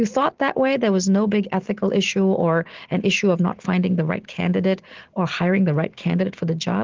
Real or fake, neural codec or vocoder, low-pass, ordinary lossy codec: real; none; 7.2 kHz; Opus, 16 kbps